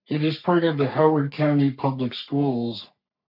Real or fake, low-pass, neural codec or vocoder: fake; 5.4 kHz; codec, 44.1 kHz, 3.4 kbps, Pupu-Codec